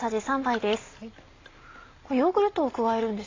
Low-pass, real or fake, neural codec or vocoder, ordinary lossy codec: 7.2 kHz; real; none; AAC, 32 kbps